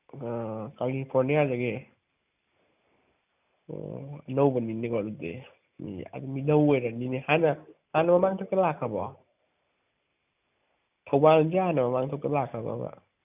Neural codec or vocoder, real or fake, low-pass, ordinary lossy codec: none; real; 3.6 kHz; none